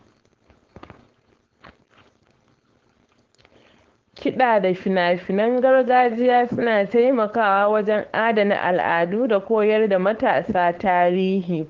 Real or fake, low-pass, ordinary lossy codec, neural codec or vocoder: fake; 7.2 kHz; Opus, 16 kbps; codec, 16 kHz, 4.8 kbps, FACodec